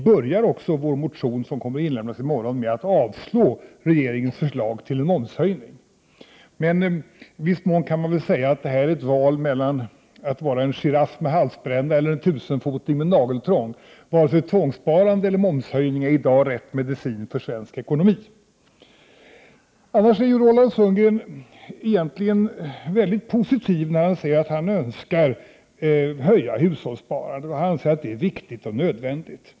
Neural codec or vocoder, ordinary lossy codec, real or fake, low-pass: none; none; real; none